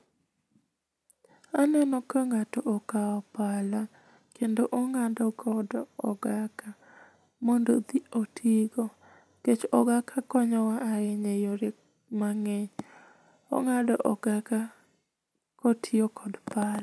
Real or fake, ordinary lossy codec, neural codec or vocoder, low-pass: real; none; none; none